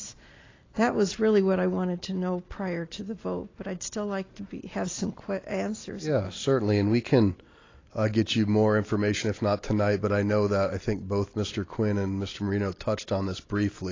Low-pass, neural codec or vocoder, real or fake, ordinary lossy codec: 7.2 kHz; none; real; AAC, 32 kbps